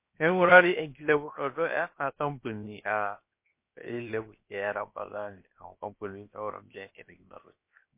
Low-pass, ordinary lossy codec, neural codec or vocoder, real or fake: 3.6 kHz; MP3, 24 kbps; codec, 16 kHz, 0.7 kbps, FocalCodec; fake